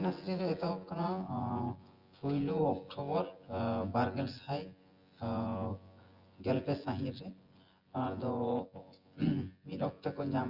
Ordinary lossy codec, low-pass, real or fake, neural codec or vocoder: Opus, 32 kbps; 5.4 kHz; fake; vocoder, 24 kHz, 100 mel bands, Vocos